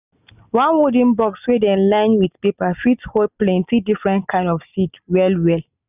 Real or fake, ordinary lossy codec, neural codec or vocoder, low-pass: real; none; none; 3.6 kHz